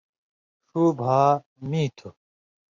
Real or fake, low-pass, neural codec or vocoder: real; 7.2 kHz; none